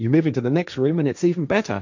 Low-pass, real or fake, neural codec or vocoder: 7.2 kHz; fake; codec, 16 kHz, 1.1 kbps, Voila-Tokenizer